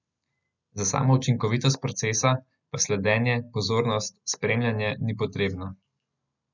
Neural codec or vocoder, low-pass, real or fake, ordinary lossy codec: none; 7.2 kHz; real; none